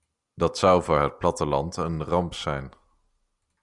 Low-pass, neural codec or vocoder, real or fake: 10.8 kHz; none; real